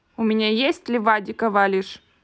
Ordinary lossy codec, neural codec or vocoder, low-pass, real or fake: none; none; none; real